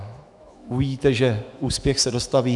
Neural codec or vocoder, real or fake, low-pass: autoencoder, 48 kHz, 128 numbers a frame, DAC-VAE, trained on Japanese speech; fake; 10.8 kHz